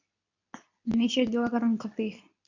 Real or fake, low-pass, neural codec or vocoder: fake; 7.2 kHz; codec, 24 kHz, 0.9 kbps, WavTokenizer, medium speech release version 2